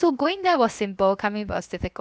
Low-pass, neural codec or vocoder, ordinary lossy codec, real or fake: none; codec, 16 kHz, 0.7 kbps, FocalCodec; none; fake